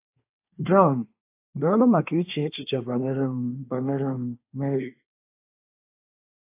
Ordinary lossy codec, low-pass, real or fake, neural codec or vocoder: AAC, 24 kbps; 3.6 kHz; fake; codec, 24 kHz, 1 kbps, SNAC